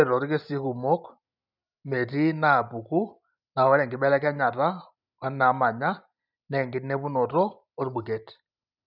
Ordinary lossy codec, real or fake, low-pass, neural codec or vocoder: none; real; 5.4 kHz; none